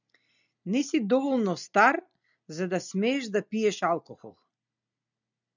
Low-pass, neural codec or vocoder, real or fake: 7.2 kHz; none; real